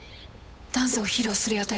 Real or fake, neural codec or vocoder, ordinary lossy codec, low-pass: fake; codec, 16 kHz, 8 kbps, FunCodec, trained on Chinese and English, 25 frames a second; none; none